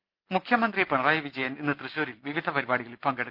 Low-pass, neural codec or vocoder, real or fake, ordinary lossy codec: 5.4 kHz; none; real; Opus, 24 kbps